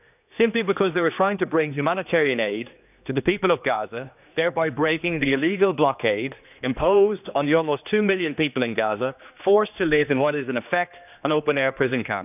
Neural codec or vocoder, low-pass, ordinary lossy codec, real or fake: codec, 16 kHz, 2 kbps, X-Codec, HuBERT features, trained on general audio; 3.6 kHz; none; fake